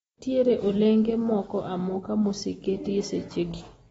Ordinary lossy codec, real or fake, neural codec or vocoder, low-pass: AAC, 24 kbps; real; none; 19.8 kHz